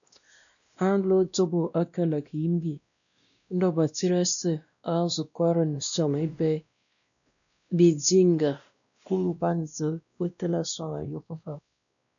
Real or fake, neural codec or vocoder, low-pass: fake; codec, 16 kHz, 1 kbps, X-Codec, WavLM features, trained on Multilingual LibriSpeech; 7.2 kHz